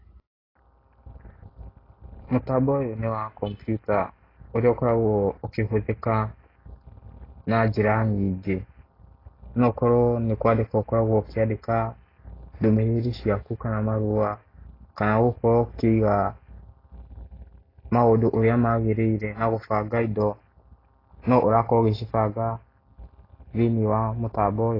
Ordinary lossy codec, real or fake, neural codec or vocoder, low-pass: AAC, 24 kbps; real; none; 5.4 kHz